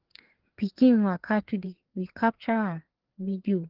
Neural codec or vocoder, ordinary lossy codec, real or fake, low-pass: codec, 16 kHz, 4 kbps, FreqCodec, larger model; Opus, 16 kbps; fake; 5.4 kHz